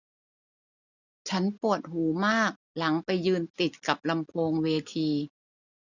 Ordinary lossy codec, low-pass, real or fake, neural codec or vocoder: none; 7.2 kHz; real; none